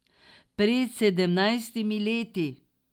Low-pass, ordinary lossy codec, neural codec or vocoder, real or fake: 19.8 kHz; Opus, 32 kbps; none; real